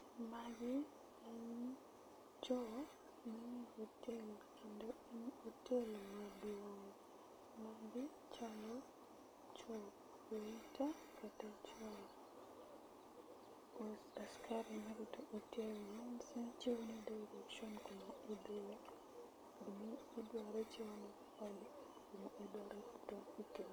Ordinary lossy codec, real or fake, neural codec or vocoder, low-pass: none; real; none; none